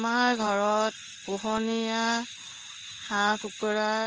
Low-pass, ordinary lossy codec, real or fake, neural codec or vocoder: 7.2 kHz; Opus, 24 kbps; fake; codec, 16 kHz in and 24 kHz out, 1 kbps, XY-Tokenizer